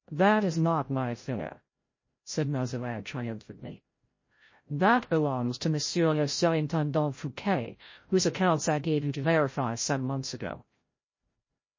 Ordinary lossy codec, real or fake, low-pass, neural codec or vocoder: MP3, 32 kbps; fake; 7.2 kHz; codec, 16 kHz, 0.5 kbps, FreqCodec, larger model